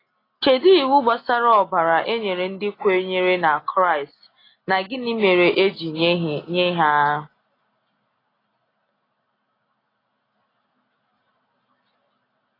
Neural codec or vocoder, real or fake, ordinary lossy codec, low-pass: none; real; AAC, 24 kbps; 5.4 kHz